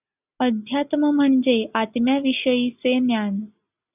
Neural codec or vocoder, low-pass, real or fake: none; 3.6 kHz; real